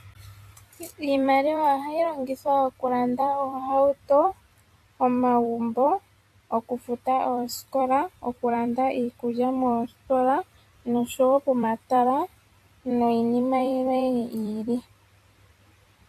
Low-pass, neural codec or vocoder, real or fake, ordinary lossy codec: 14.4 kHz; vocoder, 44.1 kHz, 128 mel bands every 256 samples, BigVGAN v2; fake; AAC, 64 kbps